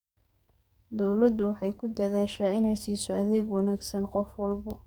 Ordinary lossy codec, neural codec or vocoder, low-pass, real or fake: none; codec, 44.1 kHz, 2.6 kbps, SNAC; none; fake